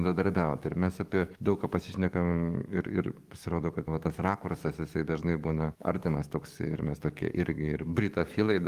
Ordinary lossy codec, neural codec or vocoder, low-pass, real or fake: Opus, 32 kbps; codec, 44.1 kHz, 7.8 kbps, DAC; 14.4 kHz; fake